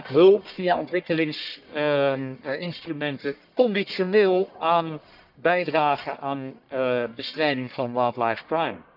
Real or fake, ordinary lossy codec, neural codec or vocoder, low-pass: fake; none; codec, 44.1 kHz, 1.7 kbps, Pupu-Codec; 5.4 kHz